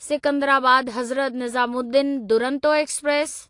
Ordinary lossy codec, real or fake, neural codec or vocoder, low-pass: AAC, 48 kbps; real; none; 10.8 kHz